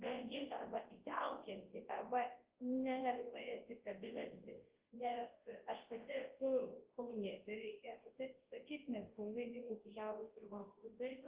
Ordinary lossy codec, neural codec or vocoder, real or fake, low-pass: Opus, 16 kbps; codec, 24 kHz, 0.9 kbps, WavTokenizer, large speech release; fake; 3.6 kHz